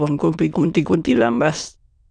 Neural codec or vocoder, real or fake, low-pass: autoencoder, 22.05 kHz, a latent of 192 numbers a frame, VITS, trained on many speakers; fake; 9.9 kHz